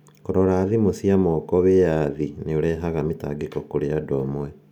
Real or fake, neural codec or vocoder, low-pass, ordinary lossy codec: real; none; 19.8 kHz; none